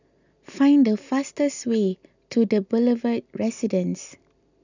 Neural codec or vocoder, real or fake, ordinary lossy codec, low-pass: none; real; none; 7.2 kHz